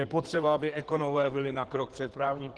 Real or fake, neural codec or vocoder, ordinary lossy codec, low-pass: fake; codec, 16 kHz in and 24 kHz out, 2.2 kbps, FireRedTTS-2 codec; Opus, 16 kbps; 9.9 kHz